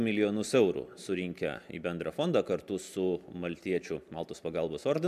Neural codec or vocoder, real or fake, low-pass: none; real; 14.4 kHz